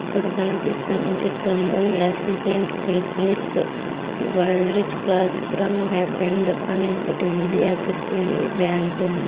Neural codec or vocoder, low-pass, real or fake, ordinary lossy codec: vocoder, 22.05 kHz, 80 mel bands, HiFi-GAN; 3.6 kHz; fake; Opus, 32 kbps